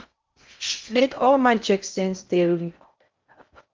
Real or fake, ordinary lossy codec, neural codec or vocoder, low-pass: fake; Opus, 24 kbps; codec, 16 kHz in and 24 kHz out, 0.6 kbps, FocalCodec, streaming, 4096 codes; 7.2 kHz